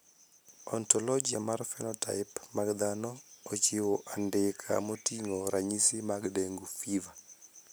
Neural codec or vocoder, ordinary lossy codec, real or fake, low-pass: none; none; real; none